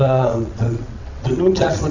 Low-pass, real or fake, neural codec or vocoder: 7.2 kHz; fake; vocoder, 22.05 kHz, 80 mel bands, WaveNeXt